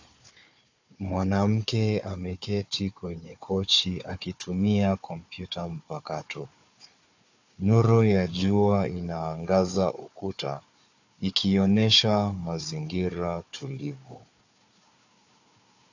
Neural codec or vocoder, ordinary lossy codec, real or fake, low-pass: codec, 16 kHz, 4 kbps, FunCodec, trained on Chinese and English, 50 frames a second; AAC, 48 kbps; fake; 7.2 kHz